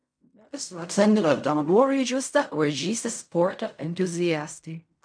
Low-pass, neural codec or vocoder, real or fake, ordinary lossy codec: 9.9 kHz; codec, 16 kHz in and 24 kHz out, 0.4 kbps, LongCat-Audio-Codec, fine tuned four codebook decoder; fake; MP3, 96 kbps